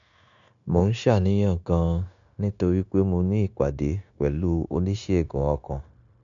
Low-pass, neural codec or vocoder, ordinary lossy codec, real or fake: 7.2 kHz; codec, 16 kHz, 0.9 kbps, LongCat-Audio-Codec; none; fake